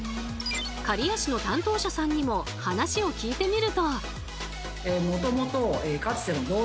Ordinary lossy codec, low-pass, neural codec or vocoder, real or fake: none; none; none; real